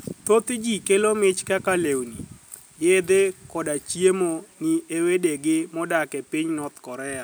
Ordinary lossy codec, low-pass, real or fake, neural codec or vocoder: none; none; real; none